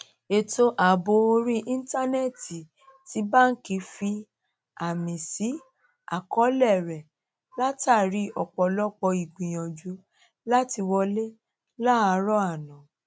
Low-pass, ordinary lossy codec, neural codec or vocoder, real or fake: none; none; none; real